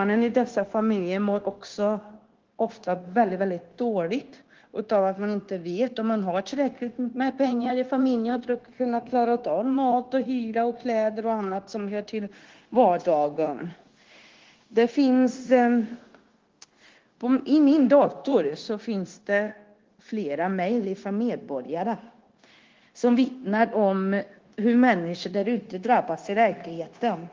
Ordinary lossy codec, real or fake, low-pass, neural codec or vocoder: Opus, 16 kbps; fake; 7.2 kHz; codec, 16 kHz, 0.9 kbps, LongCat-Audio-Codec